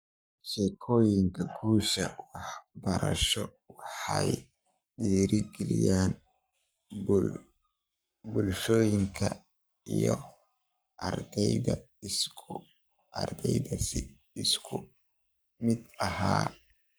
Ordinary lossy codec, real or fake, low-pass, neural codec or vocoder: none; fake; none; codec, 44.1 kHz, 7.8 kbps, Pupu-Codec